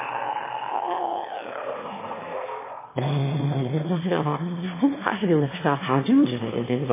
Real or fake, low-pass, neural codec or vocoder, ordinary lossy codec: fake; 3.6 kHz; autoencoder, 22.05 kHz, a latent of 192 numbers a frame, VITS, trained on one speaker; MP3, 16 kbps